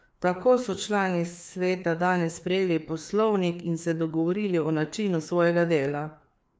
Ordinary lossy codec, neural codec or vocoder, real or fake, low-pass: none; codec, 16 kHz, 2 kbps, FreqCodec, larger model; fake; none